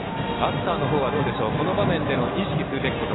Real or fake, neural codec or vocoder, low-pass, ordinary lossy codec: real; none; 7.2 kHz; AAC, 16 kbps